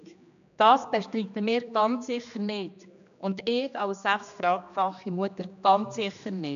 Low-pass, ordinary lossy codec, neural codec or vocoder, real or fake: 7.2 kHz; none; codec, 16 kHz, 2 kbps, X-Codec, HuBERT features, trained on general audio; fake